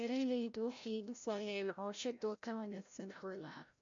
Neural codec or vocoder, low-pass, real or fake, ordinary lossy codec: codec, 16 kHz, 0.5 kbps, FreqCodec, larger model; 7.2 kHz; fake; MP3, 64 kbps